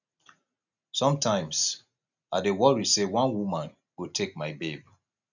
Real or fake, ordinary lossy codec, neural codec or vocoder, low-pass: real; none; none; 7.2 kHz